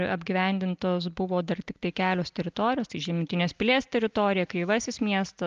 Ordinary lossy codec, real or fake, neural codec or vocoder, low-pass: Opus, 24 kbps; real; none; 7.2 kHz